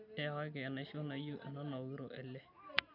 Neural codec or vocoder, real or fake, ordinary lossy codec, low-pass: none; real; none; 5.4 kHz